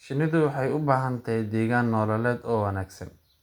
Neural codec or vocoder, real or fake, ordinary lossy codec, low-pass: none; real; none; 19.8 kHz